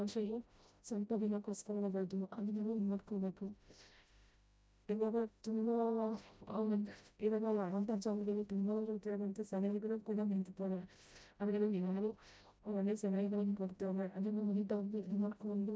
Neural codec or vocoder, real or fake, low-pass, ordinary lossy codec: codec, 16 kHz, 0.5 kbps, FreqCodec, smaller model; fake; none; none